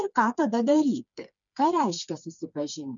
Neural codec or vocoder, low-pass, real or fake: codec, 16 kHz, 4 kbps, FreqCodec, smaller model; 7.2 kHz; fake